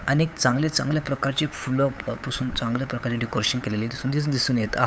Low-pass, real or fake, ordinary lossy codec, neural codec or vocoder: none; fake; none; codec, 16 kHz, 8 kbps, FunCodec, trained on LibriTTS, 25 frames a second